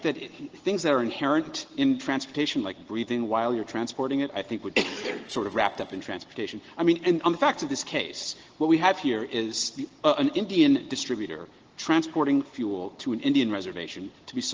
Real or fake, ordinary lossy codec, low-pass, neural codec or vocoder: real; Opus, 16 kbps; 7.2 kHz; none